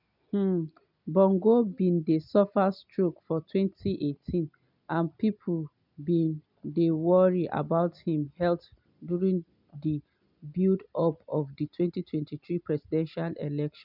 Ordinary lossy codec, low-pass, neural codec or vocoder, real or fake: none; 5.4 kHz; none; real